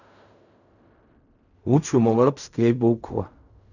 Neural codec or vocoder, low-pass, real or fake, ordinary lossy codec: codec, 16 kHz in and 24 kHz out, 0.4 kbps, LongCat-Audio-Codec, fine tuned four codebook decoder; 7.2 kHz; fake; none